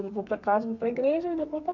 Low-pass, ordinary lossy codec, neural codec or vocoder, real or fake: 7.2 kHz; none; codec, 32 kHz, 1.9 kbps, SNAC; fake